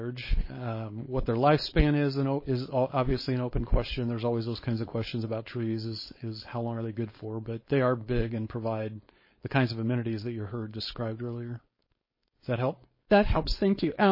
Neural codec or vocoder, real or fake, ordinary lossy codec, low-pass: codec, 16 kHz, 4.8 kbps, FACodec; fake; MP3, 24 kbps; 5.4 kHz